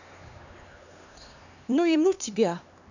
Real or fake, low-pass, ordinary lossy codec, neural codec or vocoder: fake; 7.2 kHz; none; codec, 16 kHz, 2 kbps, X-Codec, HuBERT features, trained on LibriSpeech